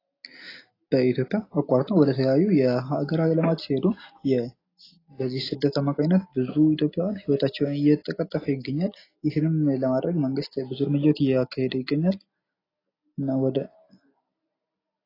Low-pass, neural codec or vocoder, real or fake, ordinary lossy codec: 5.4 kHz; none; real; AAC, 24 kbps